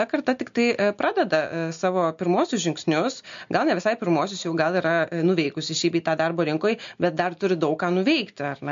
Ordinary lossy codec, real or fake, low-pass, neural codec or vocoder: MP3, 48 kbps; real; 7.2 kHz; none